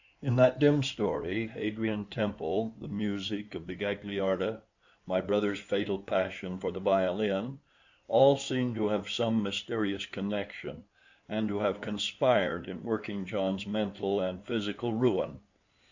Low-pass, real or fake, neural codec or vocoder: 7.2 kHz; fake; codec, 16 kHz in and 24 kHz out, 2.2 kbps, FireRedTTS-2 codec